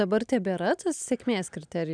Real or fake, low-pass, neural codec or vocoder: real; 9.9 kHz; none